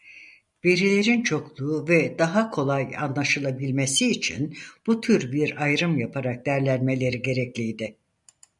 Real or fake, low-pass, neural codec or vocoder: real; 10.8 kHz; none